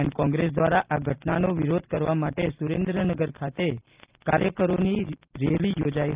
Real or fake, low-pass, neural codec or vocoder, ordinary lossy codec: real; 3.6 kHz; none; Opus, 32 kbps